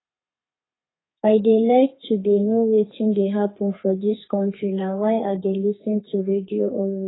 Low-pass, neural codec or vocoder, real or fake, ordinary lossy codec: 7.2 kHz; codec, 32 kHz, 1.9 kbps, SNAC; fake; AAC, 16 kbps